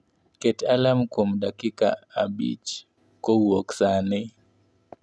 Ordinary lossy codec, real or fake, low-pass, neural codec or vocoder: none; real; none; none